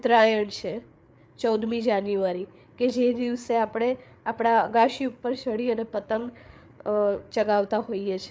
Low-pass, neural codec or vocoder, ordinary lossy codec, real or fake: none; codec, 16 kHz, 8 kbps, FreqCodec, larger model; none; fake